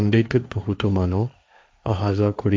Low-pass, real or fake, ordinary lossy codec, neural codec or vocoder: none; fake; none; codec, 16 kHz, 1.1 kbps, Voila-Tokenizer